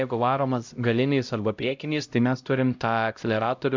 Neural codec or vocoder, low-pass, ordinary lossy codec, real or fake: codec, 16 kHz, 0.5 kbps, X-Codec, HuBERT features, trained on LibriSpeech; 7.2 kHz; MP3, 64 kbps; fake